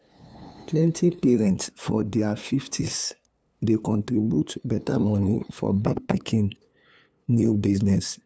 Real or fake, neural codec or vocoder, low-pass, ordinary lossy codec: fake; codec, 16 kHz, 2 kbps, FunCodec, trained on LibriTTS, 25 frames a second; none; none